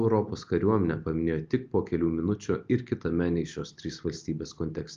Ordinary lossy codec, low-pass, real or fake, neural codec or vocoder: Opus, 32 kbps; 7.2 kHz; real; none